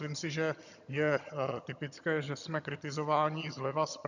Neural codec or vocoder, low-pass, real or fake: vocoder, 22.05 kHz, 80 mel bands, HiFi-GAN; 7.2 kHz; fake